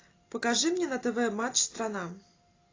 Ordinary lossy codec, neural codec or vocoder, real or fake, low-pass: AAC, 32 kbps; none; real; 7.2 kHz